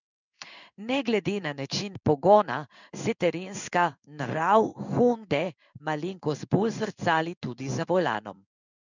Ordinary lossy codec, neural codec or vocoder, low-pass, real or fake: none; codec, 16 kHz in and 24 kHz out, 1 kbps, XY-Tokenizer; 7.2 kHz; fake